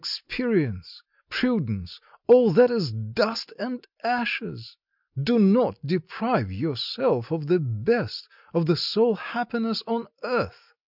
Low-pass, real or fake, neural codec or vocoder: 5.4 kHz; real; none